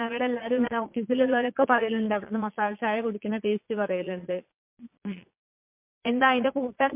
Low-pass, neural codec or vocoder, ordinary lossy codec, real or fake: 3.6 kHz; vocoder, 22.05 kHz, 80 mel bands, Vocos; MP3, 32 kbps; fake